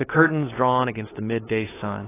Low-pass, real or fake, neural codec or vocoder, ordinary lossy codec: 3.6 kHz; fake; codec, 16 kHz, 8 kbps, FunCodec, trained on LibriTTS, 25 frames a second; AAC, 16 kbps